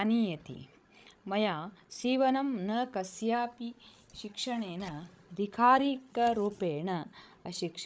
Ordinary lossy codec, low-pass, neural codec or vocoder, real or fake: none; none; codec, 16 kHz, 16 kbps, FreqCodec, larger model; fake